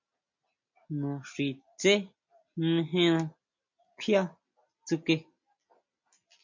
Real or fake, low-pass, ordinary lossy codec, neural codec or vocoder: real; 7.2 kHz; MP3, 48 kbps; none